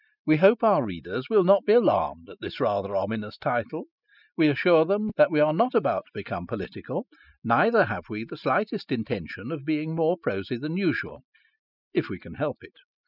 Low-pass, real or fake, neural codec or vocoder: 5.4 kHz; real; none